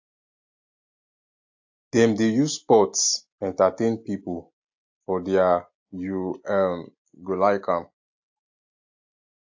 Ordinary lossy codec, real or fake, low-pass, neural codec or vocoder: AAC, 48 kbps; real; 7.2 kHz; none